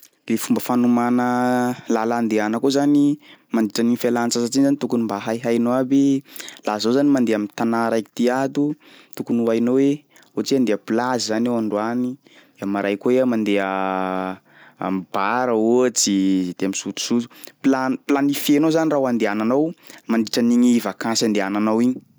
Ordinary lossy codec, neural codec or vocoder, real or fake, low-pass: none; none; real; none